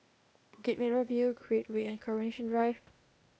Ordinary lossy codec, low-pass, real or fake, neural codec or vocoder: none; none; fake; codec, 16 kHz, 0.8 kbps, ZipCodec